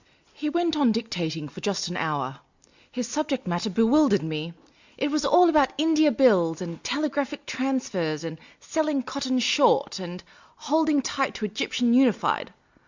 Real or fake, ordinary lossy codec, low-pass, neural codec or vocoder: real; Opus, 64 kbps; 7.2 kHz; none